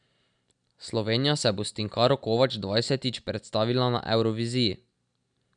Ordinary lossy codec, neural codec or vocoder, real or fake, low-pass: none; none; real; 9.9 kHz